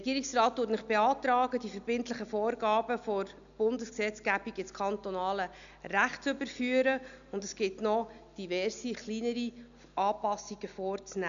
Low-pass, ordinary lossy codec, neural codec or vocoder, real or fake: 7.2 kHz; MP3, 96 kbps; none; real